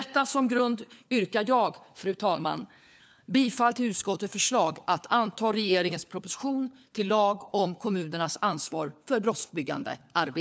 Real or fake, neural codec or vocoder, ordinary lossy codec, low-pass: fake; codec, 16 kHz, 4 kbps, FunCodec, trained on LibriTTS, 50 frames a second; none; none